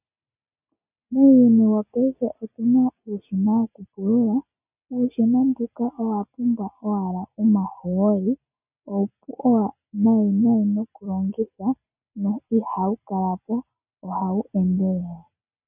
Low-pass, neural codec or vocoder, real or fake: 3.6 kHz; none; real